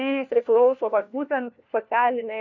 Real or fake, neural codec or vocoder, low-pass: fake; codec, 16 kHz, 1 kbps, FunCodec, trained on LibriTTS, 50 frames a second; 7.2 kHz